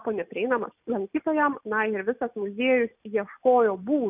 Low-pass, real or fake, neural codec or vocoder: 3.6 kHz; real; none